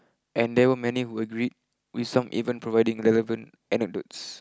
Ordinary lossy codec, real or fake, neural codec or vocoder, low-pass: none; real; none; none